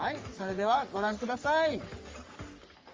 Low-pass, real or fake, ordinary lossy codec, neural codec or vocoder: 7.2 kHz; fake; Opus, 32 kbps; codec, 44.1 kHz, 3.4 kbps, Pupu-Codec